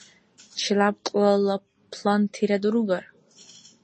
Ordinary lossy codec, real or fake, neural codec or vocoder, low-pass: MP3, 32 kbps; real; none; 9.9 kHz